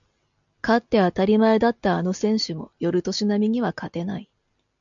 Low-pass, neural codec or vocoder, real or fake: 7.2 kHz; none; real